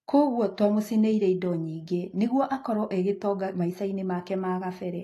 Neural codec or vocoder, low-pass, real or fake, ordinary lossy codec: none; 14.4 kHz; real; AAC, 48 kbps